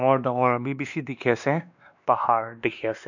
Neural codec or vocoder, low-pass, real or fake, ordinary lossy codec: codec, 16 kHz, 2 kbps, X-Codec, WavLM features, trained on Multilingual LibriSpeech; 7.2 kHz; fake; none